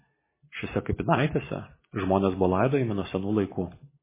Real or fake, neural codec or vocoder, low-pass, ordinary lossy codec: real; none; 3.6 kHz; MP3, 16 kbps